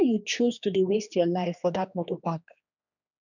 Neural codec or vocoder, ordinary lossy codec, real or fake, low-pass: codec, 16 kHz, 2 kbps, X-Codec, HuBERT features, trained on general audio; none; fake; 7.2 kHz